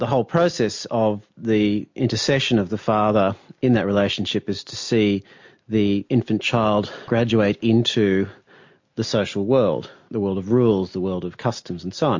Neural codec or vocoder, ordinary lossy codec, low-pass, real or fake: none; MP3, 48 kbps; 7.2 kHz; real